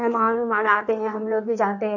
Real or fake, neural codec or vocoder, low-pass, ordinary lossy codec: fake; codec, 16 kHz in and 24 kHz out, 1.1 kbps, FireRedTTS-2 codec; 7.2 kHz; none